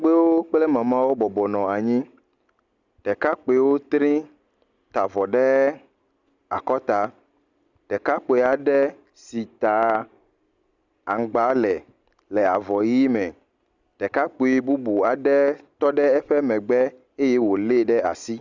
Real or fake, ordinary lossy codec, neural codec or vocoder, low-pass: real; Opus, 64 kbps; none; 7.2 kHz